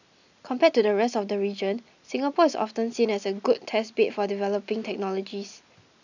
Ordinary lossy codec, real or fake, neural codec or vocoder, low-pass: none; real; none; 7.2 kHz